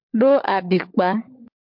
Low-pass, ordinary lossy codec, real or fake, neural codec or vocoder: 5.4 kHz; MP3, 48 kbps; fake; codec, 16 kHz, 16 kbps, FunCodec, trained on LibriTTS, 50 frames a second